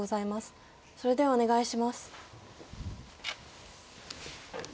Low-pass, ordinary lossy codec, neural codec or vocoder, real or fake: none; none; none; real